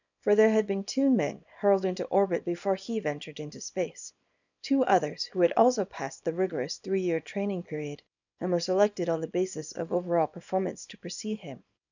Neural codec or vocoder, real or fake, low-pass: codec, 24 kHz, 0.9 kbps, WavTokenizer, small release; fake; 7.2 kHz